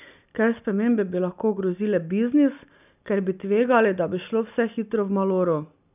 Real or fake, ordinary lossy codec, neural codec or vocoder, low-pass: real; none; none; 3.6 kHz